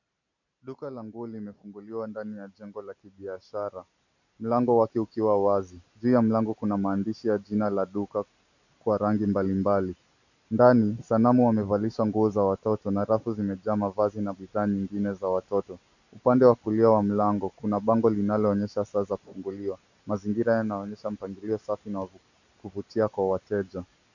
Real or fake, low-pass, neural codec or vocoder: real; 7.2 kHz; none